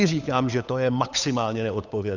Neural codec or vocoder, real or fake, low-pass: codec, 16 kHz, 8 kbps, FunCodec, trained on Chinese and English, 25 frames a second; fake; 7.2 kHz